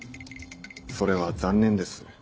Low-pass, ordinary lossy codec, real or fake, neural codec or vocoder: none; none; real; none